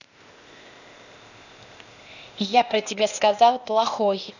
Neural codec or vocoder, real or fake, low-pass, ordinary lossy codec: codec, 16 kHz, 0.8 kbps, ZipCodec; fake; 7.2 kHz; none